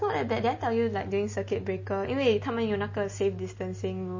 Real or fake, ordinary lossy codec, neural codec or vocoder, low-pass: real; MP3, 32 kbps; none; 7.2 kHz